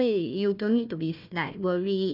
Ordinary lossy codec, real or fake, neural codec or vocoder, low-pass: none; fake; codec, 16 kHz, 1 kbps, FunCodec, trained on Chinese and English, 50 frames a second; 5.4 kHz